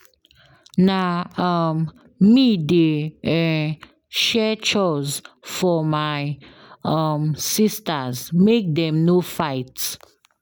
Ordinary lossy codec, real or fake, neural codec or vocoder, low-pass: none; real; none; none